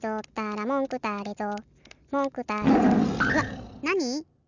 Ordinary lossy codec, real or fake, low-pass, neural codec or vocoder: none; real; 7.2 kHz; none